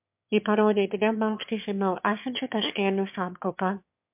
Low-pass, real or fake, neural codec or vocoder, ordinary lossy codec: 3.6 kHz; fake; autoencoder, 22.05 kHz, a latent of 192 numbers a frame, VITS, trained on one speaker; MP3, 32 kbps